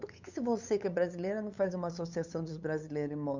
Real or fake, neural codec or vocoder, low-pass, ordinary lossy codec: fake; codec, 16 kHz, 8 kbps, FunCodec, trained on LibriTTS, 25 frames a second; 7.2 kHz; none